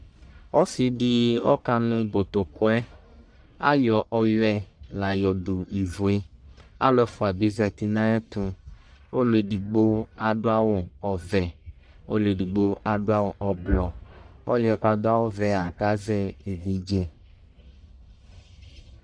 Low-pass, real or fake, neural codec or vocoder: 9.9 kHz; fake; codec, 44.1 kHz, 1.7 kbps, Pupu-Codec